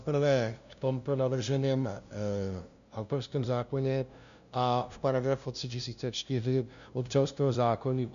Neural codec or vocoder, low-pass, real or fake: codec, 16 kHz, 0.5 kbps, FunCodec, trained on LibriTTS, 25 frames a second; 7.2 kHz; fake